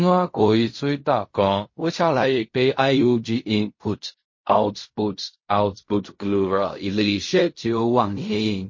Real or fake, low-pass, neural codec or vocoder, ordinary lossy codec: fake; 7.2 kHz; codec, 16 kHz in and 24 kHz out, 0.4 kbps, LongCat-Audio-Codec, fine tuned four codebook decoder; MP3, 32 kbps